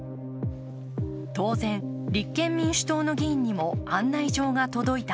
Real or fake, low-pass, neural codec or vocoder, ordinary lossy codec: real; none; none; none